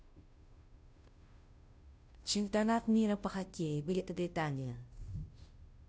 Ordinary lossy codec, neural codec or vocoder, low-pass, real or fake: none; codec, 16 kHz, 0.5 kbps, FunCodec, trained on Chinese and English, 25 frames a second; none; fake